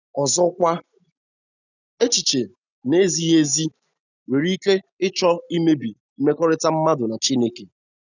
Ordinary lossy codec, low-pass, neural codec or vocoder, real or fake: none; 7.2 kHz; none; real